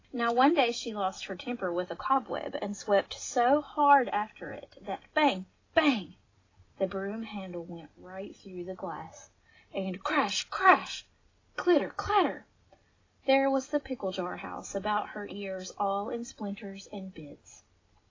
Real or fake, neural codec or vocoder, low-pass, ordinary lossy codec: real; none; 7.2 kHz; AAC, 32 kbps